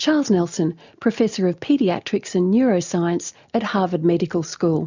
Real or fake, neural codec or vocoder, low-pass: real; none; 7.2 kHz